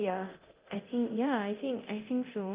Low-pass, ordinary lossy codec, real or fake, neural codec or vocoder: 3.6 kHz; Opus, 24 kbps; fake; codec, 24 kHz, 0.9 kbps, DualCodec